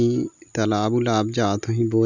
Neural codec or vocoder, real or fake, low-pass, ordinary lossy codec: none; real; 7.2 kHz; none